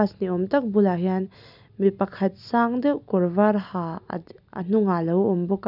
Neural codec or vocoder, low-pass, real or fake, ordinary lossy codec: none; 5.4 kHz; real; none